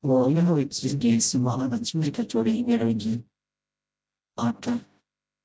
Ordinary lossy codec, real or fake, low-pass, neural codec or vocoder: none; fake; none; codec, 16 kHz, 0.5 kbps, FreqCodec, smaller model